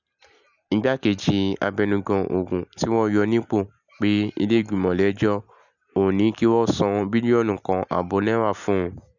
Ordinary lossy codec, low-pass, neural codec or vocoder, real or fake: none; 7.2 kHz; none; real